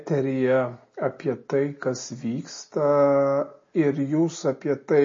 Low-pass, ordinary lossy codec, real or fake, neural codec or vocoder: 7.2 kHz; MP3, 32 kbps; real; none